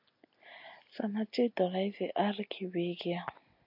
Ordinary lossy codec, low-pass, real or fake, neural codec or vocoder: MP3, 48 kbps; 5.4 kHz; real; none